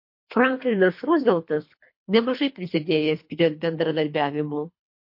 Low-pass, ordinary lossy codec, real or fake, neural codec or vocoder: 5.4 kHz; MP3, 32 kbps; fake; codec, 24 kHz, 3 kbps, HILCodec